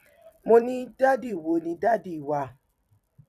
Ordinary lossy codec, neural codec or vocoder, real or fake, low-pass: none; vocoder, 44.1 kHz, 128 mel bands every 256 samples, BigVGAN v2; fake; 14.4 kHz